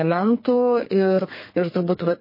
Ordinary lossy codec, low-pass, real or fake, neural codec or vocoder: MP3, 24 kbps; 5.4 kHz; fake; codec, 32 kHz, 1.9 kbps, SNAC